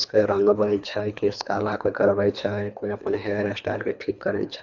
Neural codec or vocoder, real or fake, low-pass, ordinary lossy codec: codec, 24 kHz, 3 kbps, HILCodec; fake; 7.2 kHz; none